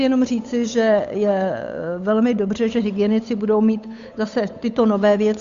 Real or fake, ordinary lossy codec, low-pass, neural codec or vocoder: fake; Opus, 64 kbps; 7.2 kHz; codec, 16 kHz, 8 kbps, FunCodec, trained on Chinese and English, 25 frames a second